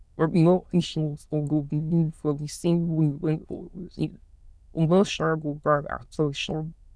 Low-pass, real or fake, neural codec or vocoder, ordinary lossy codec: none; fake; autoencoder, 22.05 kHz, a latent of 192 numbers a frame, VITS, trained on many speakers; none